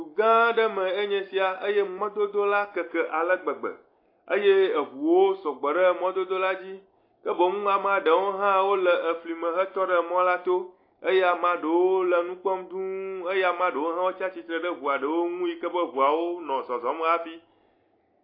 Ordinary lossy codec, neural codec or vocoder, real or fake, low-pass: AAC, 32 kbps; none; real; 5.4 kHz